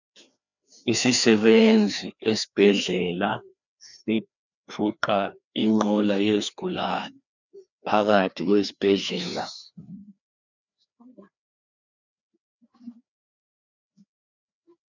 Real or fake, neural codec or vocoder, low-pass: fake; codec, 16 kHz, 2 kbps, FreqCodec, larger model; 7.2 kHz